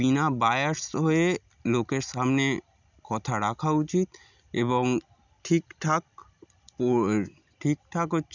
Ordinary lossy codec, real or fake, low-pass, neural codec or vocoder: none; real; 7.2 kHz; none